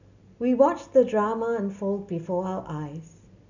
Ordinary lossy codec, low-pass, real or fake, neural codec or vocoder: none; 7.2 kHz; real; none